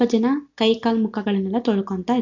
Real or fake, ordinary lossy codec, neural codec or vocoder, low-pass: real; none; none; 7.2 kHz